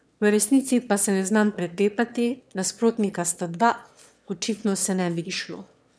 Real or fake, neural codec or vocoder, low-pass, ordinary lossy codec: fake; autoencoder, 22.05 kHz, a latent of 192 numbers a frame, VITS, trained on one speaker; none; none